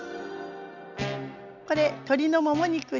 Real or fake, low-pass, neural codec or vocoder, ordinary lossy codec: real; 7.2 kHz; none; none